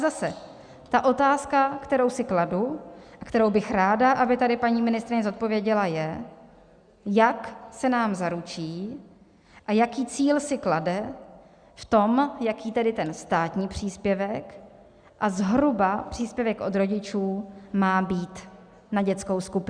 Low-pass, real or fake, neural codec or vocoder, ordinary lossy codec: 9.9 kHz; real; none; Opus, 64 kbps